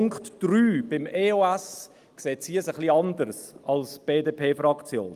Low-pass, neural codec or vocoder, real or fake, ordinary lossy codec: 14.4 kHz; none; real; Opus, 32 kbps